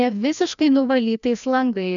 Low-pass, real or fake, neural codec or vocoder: 7.2 kHz; fake; codec, 16 kHz, 1 kbps, FreqCodec, larger model